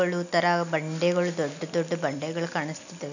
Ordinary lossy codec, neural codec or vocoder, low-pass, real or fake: none; none; 7.2 kHz; real